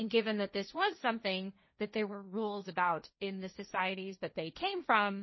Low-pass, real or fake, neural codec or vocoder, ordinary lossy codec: 7.2 kHz; fake; codec, 16 kHz, 1.1 kbps, Voila-Tokenizer; MP3, 24 kbps